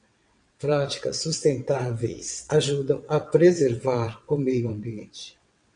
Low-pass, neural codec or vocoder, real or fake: 9.9 kHz; vocoder, 22.05 kHz, 80 mel bands, WaveNeXt; fake